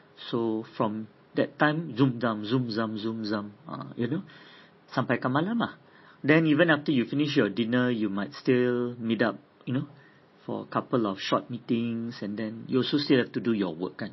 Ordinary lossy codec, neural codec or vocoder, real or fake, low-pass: MP3, 24 kbps; none; real; 7.2 kHz